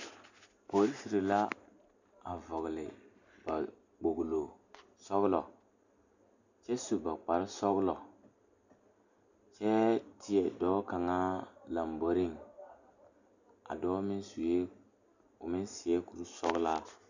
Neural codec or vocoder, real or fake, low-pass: none; real; 7.2 kHz